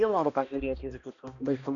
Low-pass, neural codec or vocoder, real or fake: 7.2 kHz; codec, 16 kHz, 1 kbps, X-Codec, HuBERT features, trained on balanced general audio; fake